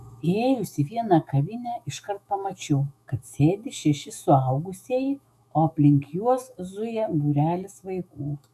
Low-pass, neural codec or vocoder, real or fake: 14.4 kHz; autoencoder, 48 kHz, 128 numbers a frame, DAC-VAE, trained on Japanese speech; fake